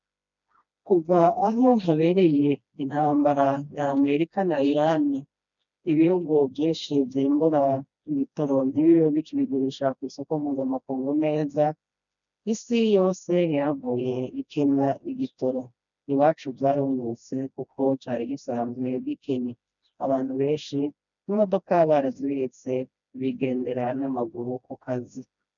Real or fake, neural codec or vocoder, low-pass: fake; codec, 16 kHz, 1 kbps, FreqCodec, smaller model; 7.2 kHz